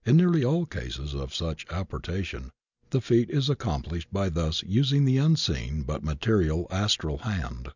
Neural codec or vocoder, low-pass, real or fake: none; 7.2 kHz; real